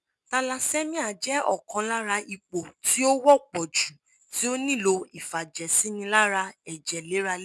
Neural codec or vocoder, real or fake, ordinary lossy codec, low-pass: none; real; none; none